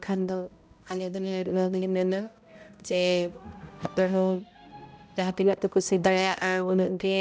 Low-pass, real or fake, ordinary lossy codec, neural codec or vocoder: none; fake; none; codec, 16 kHz, 0.5 kbps, X-Codec, HuBERT features, trained on balanced general audio